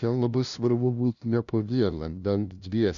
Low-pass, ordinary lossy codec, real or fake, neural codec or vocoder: 7.2 kHz; MP3, 96 kbps; fake; codec, 16 kHz, 0.5 kbps, FunCodec, trained on LibriTTS, 25 frames a second